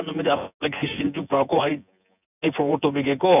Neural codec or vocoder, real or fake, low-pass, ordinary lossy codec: vocoder, 24 kHz, 100 mel bands, Vocos; fake; 3.6 kHz; none